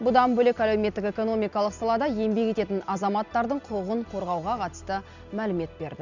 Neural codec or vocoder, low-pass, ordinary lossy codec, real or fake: none; 7.2 kHz; none; real